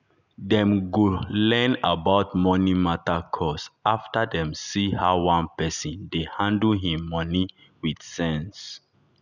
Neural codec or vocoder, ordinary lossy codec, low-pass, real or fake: none; none; 7.2 kHz; real